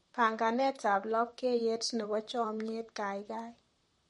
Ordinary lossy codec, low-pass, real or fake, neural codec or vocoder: MP3, 48 kbps; 19.8 kHz; fake; vocoder, 44.1 kHz, 128 mel bands, Pupu-Vocoder